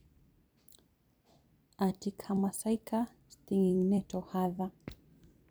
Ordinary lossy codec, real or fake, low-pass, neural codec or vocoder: none; fake; none; vocoder, 44.1 kHz, 128 mel bands every 256 samples, BigVGAN v2